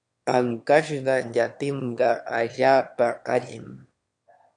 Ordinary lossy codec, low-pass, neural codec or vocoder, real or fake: MP3, 64 kbps; 9.9 kHz; autoencoder, 22.05 kHz, a latent of 192 numbers a frame, VITS, trained on one speaker; fake